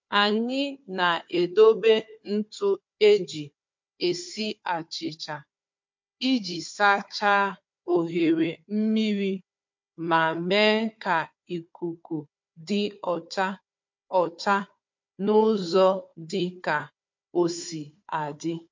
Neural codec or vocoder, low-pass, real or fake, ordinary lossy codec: codec, 16 kHz, 4 kbps, FunCodec, trained on Chinese and English, 50 frames a second; 7.2 kHz; fake; MP3, 48 kbps